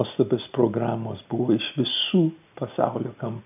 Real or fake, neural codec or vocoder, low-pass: real; none; 3.6 kHz